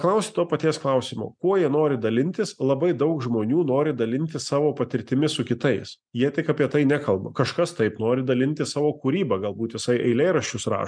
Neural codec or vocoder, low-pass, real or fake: none; 9.9 kHz; real